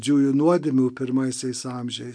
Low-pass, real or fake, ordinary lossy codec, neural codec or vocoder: 9.9 kHz; real; MP3, 64 kbps; none